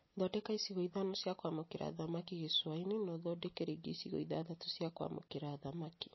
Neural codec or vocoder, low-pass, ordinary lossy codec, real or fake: none; 7.2 kHz; MP3, 24 kbps; real